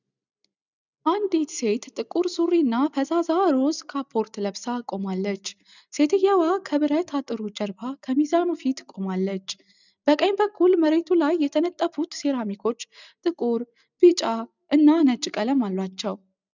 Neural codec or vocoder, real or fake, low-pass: none; real; 7.2 kHz